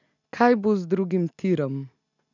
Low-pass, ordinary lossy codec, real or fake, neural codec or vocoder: 7.2 kHz; none; real; none